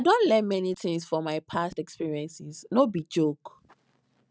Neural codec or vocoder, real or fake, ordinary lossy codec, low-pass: none; real; none; none